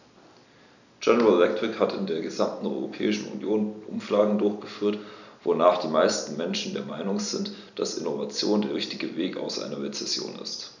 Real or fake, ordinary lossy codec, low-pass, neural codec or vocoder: real; none; 7.2 kHz; none